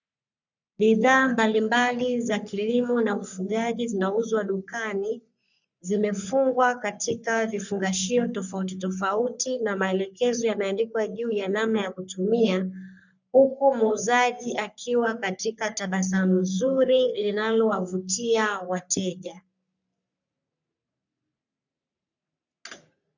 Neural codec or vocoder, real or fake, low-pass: codec, 44.1 kHz, 3.4 kbps, Pupu-Codec; fake; 7.2 kHz